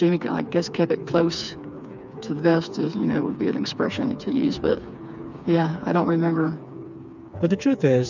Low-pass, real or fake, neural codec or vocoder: 7.2 kHz; fake; codec, 16 kHz, 4 kbps, FreqCodec, smaller model